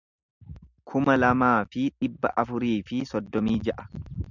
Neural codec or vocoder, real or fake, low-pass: none; real; 7.2 kHz